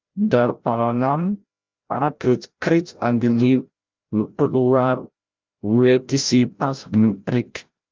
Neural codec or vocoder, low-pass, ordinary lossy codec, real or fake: codec, 16 kHz, 0.5 kbps, FreqCodec, larger model; 7.2 kHz; Opus, 24 kbps; fake